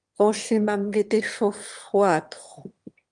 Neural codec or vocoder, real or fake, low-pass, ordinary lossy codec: autoencoder, 22.05 kHz, a latent of 192 numbers a frame, VITS, trained on one speaker; fake; 9.9 kHz; Opus, 24 kbps